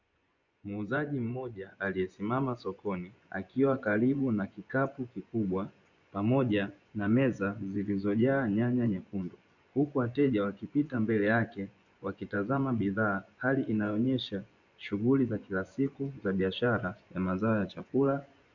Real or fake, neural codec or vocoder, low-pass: fake; vocoder, 24 kHz, 100 mel bands, Vocos; 7.2 kHz